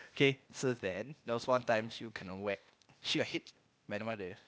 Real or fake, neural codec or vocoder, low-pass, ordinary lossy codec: fake; codec, 16 kHz, 0.8 kbps, ZipCodec; none; none